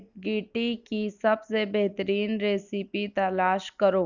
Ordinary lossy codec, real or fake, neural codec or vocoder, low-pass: none; real; none; 7.2 kHz